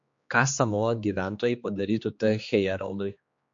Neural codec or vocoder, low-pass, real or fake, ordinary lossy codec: codec, 16 kHz, 2 kbps, X-Codec, HuBERT features, trained on general audio; 7.2 kHz; fake; MP3, 48 kbps